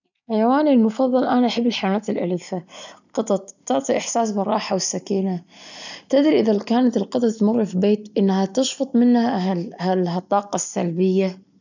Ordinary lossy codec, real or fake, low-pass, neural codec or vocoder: none; real; 7.2 kHz; none